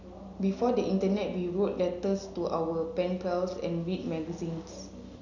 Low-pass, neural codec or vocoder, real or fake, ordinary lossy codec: 7.2 kHz; none; real; none